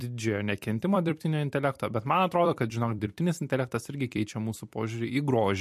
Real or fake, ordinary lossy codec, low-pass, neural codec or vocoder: fake; MP3, 64 kbps; 14.4 kHz; vocoder, 44.1 kHz, 128 mel bands every 256 samples, BigVGAN v2